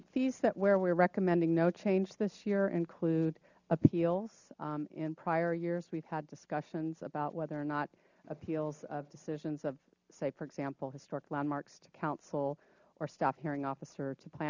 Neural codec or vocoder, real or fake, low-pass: none; real; 7.2 kHz